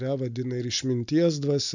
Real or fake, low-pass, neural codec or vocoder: real; 7.2 kHz; none